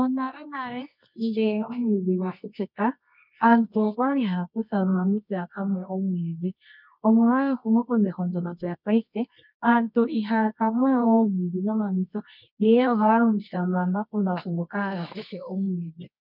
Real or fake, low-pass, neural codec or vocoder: fake; 5.4 kHz; codec, 24 kHz, 0.9 kbps, WavTokenizer, medium music audio release